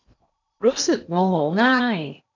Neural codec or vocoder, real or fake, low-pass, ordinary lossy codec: codec, 16 kHz in and 24 kHz out, 0.8 kbps, FocalCodec, streaming, 65536 codes; fake; 7.2 kHz; none